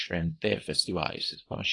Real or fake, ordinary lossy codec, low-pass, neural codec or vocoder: fake; AAC, 32 kbps; 10.8 kHz; codec, 24 kHz, 0.9 kbps, WavTokenizer, small release